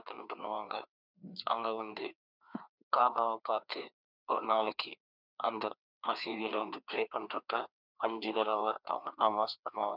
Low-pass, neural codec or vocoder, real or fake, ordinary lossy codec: 5.4 kHz; codec, 16 kHz, 2 kbps, FreqCodec, larger model; fake; none